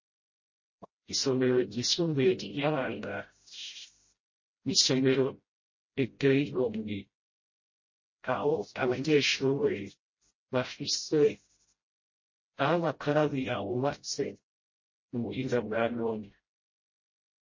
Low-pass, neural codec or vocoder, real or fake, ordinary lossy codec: 7.2 kHz; codec, 16 kHz, 0.5 kbps, FreqCodec, smaller model; fake; MP3, 32 kbps